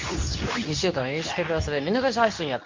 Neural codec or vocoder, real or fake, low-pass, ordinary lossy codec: codec, 24 kHz, 0.9 kbps, WavTokenizer, medium speech release version 2; fake; 7.2 kHz; MP3, 48 kbps